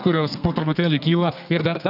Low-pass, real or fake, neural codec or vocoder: 5.4 kHz; fake; codec, 32 kHz, 1.9 kbps, SNAC